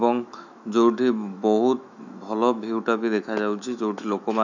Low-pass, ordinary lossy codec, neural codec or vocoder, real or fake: 7.2 kHz; none; autoencoder, 48 kHz, 128 numbers a frame, DAC-VAE, trained on Japanese speech; fake